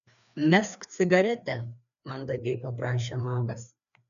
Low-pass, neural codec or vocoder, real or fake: 7.2 kHz; codec, 16 kHz, 2 kbps, FreqCodec, larger model; fake